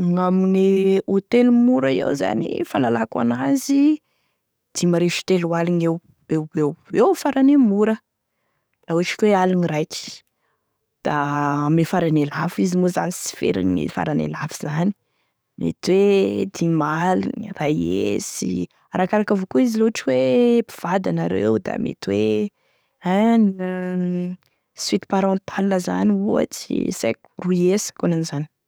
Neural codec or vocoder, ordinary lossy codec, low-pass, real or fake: codec, 44.1 kHz, 7.8 kbps, DAC; none; none; fake